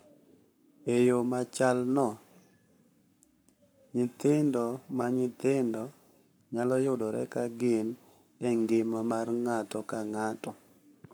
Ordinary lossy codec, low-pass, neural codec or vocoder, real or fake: none; none; codec, 44.1 kHz, 7.8 kbps, Pupu-Codec; fake